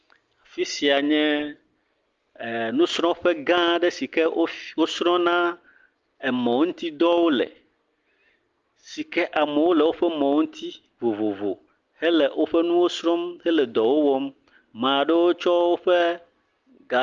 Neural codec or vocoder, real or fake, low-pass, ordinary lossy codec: none; real; 7.2 kHz; Opus, 32 kbps